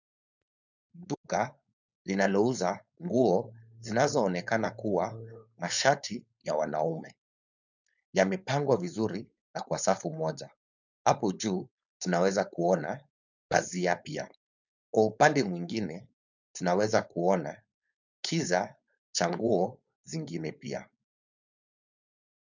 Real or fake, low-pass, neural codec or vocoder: fake; 7.2 kHz; codec, 16 kHz, 4.8 kbps, FACodec